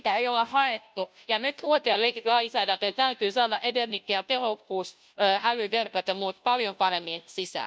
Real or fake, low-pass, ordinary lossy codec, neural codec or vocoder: fake; none; none; codec, 16 kHz, 0.5 kbps, FunCodec, trained on Chinese and English, 25 frames a second